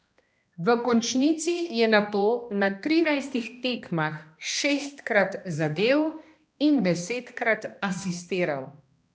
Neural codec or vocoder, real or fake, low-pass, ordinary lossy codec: codec, 16 kHz, 1 kbps, X-Codec, HuBERT features, trained on balanced general audio; fake; none; none